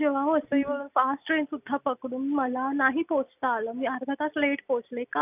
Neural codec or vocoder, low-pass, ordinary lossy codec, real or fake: none; 3.6 kHz; none; real